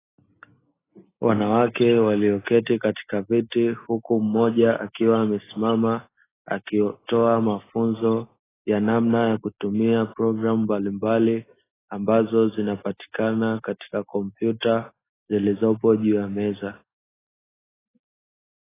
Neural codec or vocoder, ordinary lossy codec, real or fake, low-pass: none; AAC, 16 kbps; real; 3.6 kHz